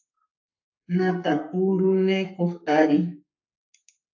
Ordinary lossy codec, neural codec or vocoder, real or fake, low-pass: AAC, 48 kbps; codec, 32 kHz, 1.9 kbps, SNAC; fake; 7.2 kHz